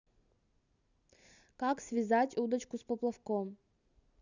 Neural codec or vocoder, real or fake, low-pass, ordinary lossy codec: none; real; 7.2 kHz; none